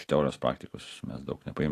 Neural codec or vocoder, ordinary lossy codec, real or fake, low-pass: none; AAC, 48 kbps; real; 14.4 kHz